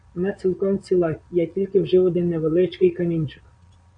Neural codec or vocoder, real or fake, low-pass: vocoder, 22.05 kHz, 80 mel bands, Vocos; fake; 9.9 kHz